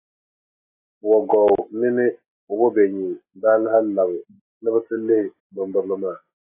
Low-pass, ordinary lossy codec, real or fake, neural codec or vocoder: 3.6 kHz; AAC, 24 kbps; real; none